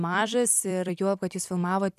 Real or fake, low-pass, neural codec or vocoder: fake; 14.4 kHz; vocoder, 44.1 kHz, 128 mel bands every 256 samples, BigVGAN v2